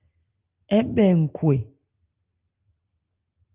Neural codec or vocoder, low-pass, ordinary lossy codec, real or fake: none; 3.6 kHz; Opus, 24 kbps; real